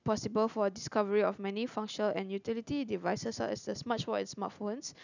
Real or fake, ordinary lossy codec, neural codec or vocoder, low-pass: real; none; none; 7.2 kHz